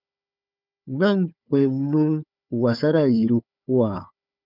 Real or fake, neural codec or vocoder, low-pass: fake; codec, 16 kHz, 4 kbps, FunCodec, trained on Chinese and English, 50 frames a second; 5.4 kHz